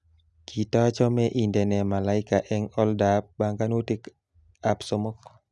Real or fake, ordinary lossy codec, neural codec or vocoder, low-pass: real; none; none; 10.8 kHz